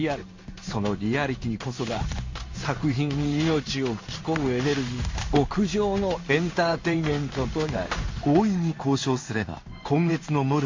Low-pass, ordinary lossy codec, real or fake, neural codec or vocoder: 7.2 kHz; MP3, 48 kbps; fake; codec, 16 kHz in and 24 kHz out, 1 kbps, XY-Tokenizer